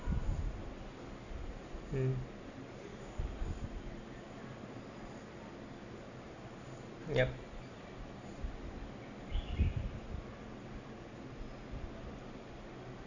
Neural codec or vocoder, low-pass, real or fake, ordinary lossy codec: none; 7.2 kHz; real; none